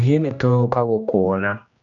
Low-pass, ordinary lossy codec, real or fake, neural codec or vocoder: 7.2 kHz; none; fake; codec, 16 kHz, 1 kbps, X-Codec, HuBERT features, trained on general audio